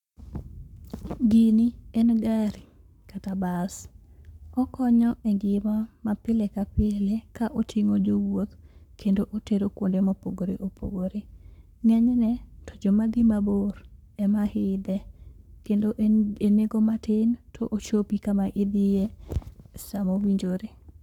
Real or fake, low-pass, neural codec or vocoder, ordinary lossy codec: fake; 19.8 kHz; codec, 44.1 kHz, 7.8 kbps, Pupu-Codec; none